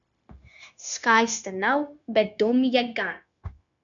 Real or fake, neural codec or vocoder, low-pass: fake; codec, 16 kHz, 0.9 kbps, LongCat-Audio-Codec; 7.2 kHz